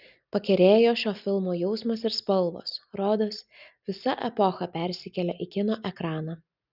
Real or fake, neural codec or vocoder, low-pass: real; none; 5.4 kHz